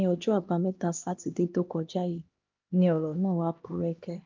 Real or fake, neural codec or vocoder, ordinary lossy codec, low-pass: fake; codec, 16 kHz, 1 kbps, X-Codec, HuBERT features, trained on LibriSpeech; Opus, 24 kbps; 7.2 kHz